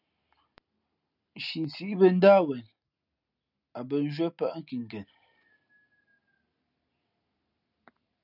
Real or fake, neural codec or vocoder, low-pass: real; none; 5.4 kHz